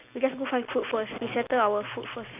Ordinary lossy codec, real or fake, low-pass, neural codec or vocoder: none; real; 3.6 kHz; none